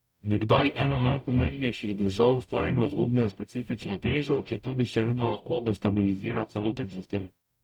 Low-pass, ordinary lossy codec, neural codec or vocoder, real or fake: 19.8 kHz; none; codec, 44.1 kHz, 0.9 kbps, DAC; fake